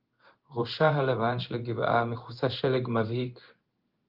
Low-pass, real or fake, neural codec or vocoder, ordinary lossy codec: 5.4 kHz; fake; codec, 16 kHz in and 24 kHz out, 1 kbps, XY-Tokenizer; Opus, 32 kbps